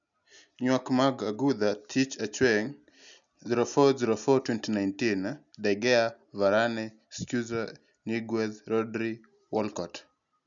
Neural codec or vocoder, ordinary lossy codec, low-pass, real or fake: none; none; 7.2 kHz; real